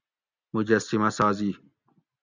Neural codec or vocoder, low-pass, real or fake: none; 7.2 kHz; real